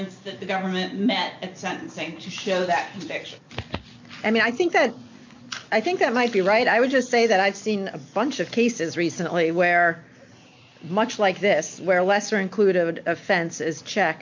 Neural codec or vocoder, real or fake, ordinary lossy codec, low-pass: none; real; MP3, 64 kbps; 7.2 kHz